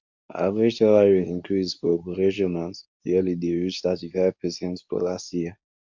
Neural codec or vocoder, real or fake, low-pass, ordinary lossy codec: codec, 24 kHz, 0.9 kbps, WavTokenizer, medium speech release version 2; fake; 7.2 kHz; MP3, 64 kbps